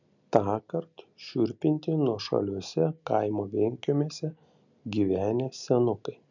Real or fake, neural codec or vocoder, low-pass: real; none; 7.2 kHz